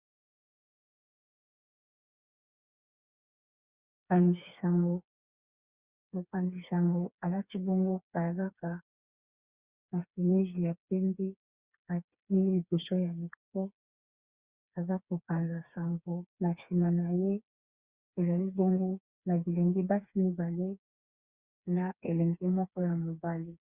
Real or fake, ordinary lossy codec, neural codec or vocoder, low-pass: fake; Opus, 64 kbps; codec, 16 kHz, 4 kbps, FreqCodec, smaller model; 3.6 kHz